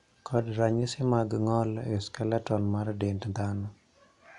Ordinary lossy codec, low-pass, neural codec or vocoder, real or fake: none; 10.8 kHz; none; real